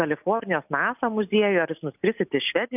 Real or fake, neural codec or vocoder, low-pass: real; none; 3.6 kHz